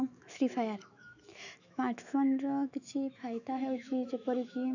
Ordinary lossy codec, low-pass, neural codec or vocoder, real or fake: none; 7.2 kHz; autoencoder, 48 kHz, 128 numbers a frame, DAC-VAE, trained on Japanese speech; fake